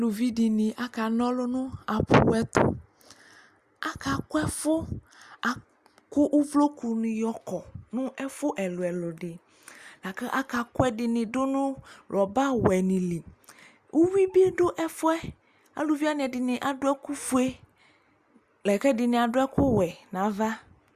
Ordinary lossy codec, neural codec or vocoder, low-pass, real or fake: Opus, 64 kbps; none; 14.4 kHz; real